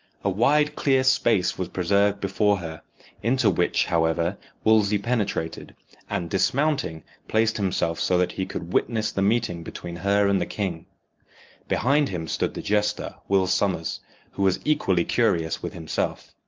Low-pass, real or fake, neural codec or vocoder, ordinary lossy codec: 7.2 kHz; real; none; Opus, 32 kbps